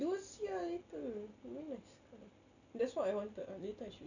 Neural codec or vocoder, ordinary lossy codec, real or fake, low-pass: none; none; real; 7.2 kHz